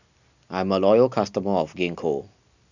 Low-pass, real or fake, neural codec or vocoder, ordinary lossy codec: 7.2 kHz; real; none; none